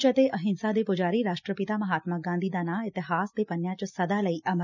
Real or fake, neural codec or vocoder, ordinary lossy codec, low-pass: real; none; none; 7.2 kHz